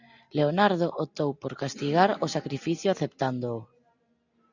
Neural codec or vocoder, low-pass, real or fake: none; 7.2 kHz; real